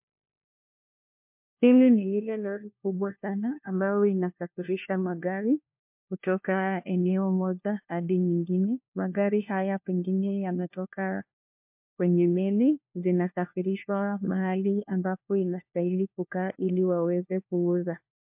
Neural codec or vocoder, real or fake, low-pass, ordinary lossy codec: codec, 16 kHz, 1 kbps, FunCodec, trained on LibriTTS, 50 frames a second; fake; 3.6 kHz; MP3, 32 kbps